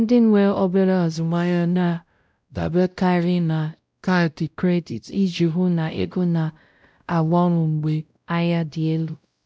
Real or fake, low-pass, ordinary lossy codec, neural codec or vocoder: fake; none; none; codec, 16 kHz, 0.5 kbps, X-Codec, WavLM features, trained on Multilingual LibriSpeech